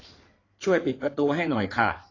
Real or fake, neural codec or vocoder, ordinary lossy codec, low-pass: fake; codec, 16 kHz in and 24 kHz out, 2.2 kbps, FireRedTTS-2 codec; AAC, 32 kbps; 7.2 kHz